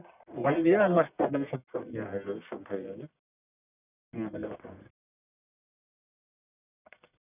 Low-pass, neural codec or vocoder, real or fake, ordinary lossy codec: 3.6 kHz; codec, 44.1 kHz, 1.7 kbps, Pupu-Codec; fake; none